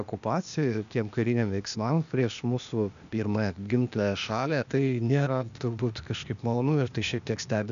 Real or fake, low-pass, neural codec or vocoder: fake; 7.2 kHz; codec, 16 kHz, 0.8 kbps, ZipCodec